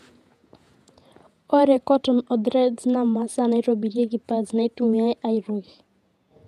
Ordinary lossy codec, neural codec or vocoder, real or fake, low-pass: none; vocoder, 48 kHz, 128 mel bands, Vocos; fake; 14.4 kHz